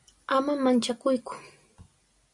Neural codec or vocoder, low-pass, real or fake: none; 10.8 kHz; real